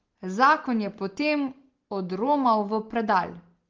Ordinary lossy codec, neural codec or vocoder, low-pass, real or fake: Opus, 16 kbps; none; 7.2 kHz; real